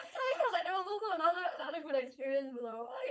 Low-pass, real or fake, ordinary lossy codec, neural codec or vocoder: none; fake; none; codec, 16 kHz, 4.8 kbps, FACodec